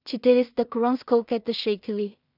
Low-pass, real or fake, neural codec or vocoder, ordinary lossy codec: 5.4 kHz; fake; codec, 16 kHz in and 24 kHz out, 0.4 kbps, LongCat-Audio-Codec, two codebook decoder; AAC, 48 kbps